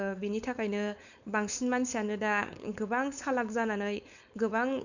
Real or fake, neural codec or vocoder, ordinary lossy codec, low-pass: fake; codec, 16 kHz, 4.8 kbps, FACodec; none; 7.2 kHz